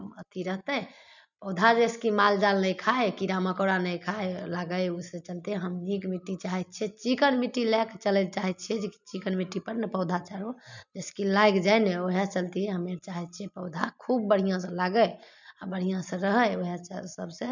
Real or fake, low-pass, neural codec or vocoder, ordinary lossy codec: real; 7.2 kHz; none; none